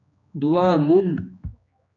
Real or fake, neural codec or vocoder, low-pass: fake; codec, 16 kHz, 2 kbps, X-Codec, HuBERT features, trained on general audio; 7.2 kHz